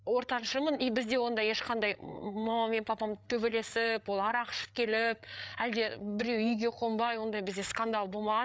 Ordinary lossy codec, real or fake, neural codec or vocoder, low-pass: none; fake; codec, 16 kHz, 8 kbps, FreqCodec, larger model; none